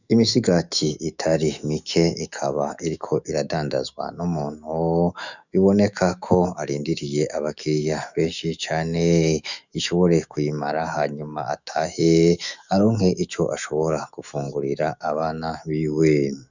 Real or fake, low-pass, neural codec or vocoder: fake; 7.2 kHz; autoencoder, 48 kHz, 128 numbers a frame, DAC-VAE, trained on Japanese speech